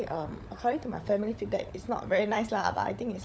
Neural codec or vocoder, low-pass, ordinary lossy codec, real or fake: codec, 16 kHz, 16 kbps, FunCodec, trained on LibriTTS, 50 frames a second; none; none; fake